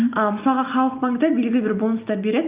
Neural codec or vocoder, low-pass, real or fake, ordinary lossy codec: none; 3.6 kHz; real; Opus, 24 kbps